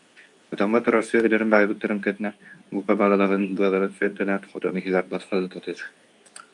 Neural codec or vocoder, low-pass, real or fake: codec, 24 kHz, 0.9 kbps, WavTokenizer, medium speech release version 2; 10.8 kHz; fake